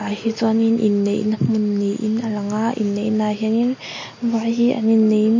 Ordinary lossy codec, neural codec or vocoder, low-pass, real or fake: MP3, 32 kbps; none; 7.2 kHz; real